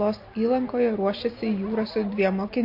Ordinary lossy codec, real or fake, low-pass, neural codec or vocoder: MP3, 32 kbps; real; 5.4 kHz; none